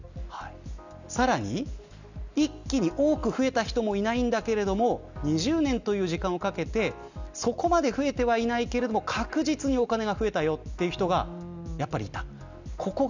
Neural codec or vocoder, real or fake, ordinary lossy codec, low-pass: none; real; none; 7.2 kHz